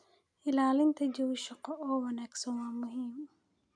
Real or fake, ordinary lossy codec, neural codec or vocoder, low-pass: real; none; none; 9.9 kHz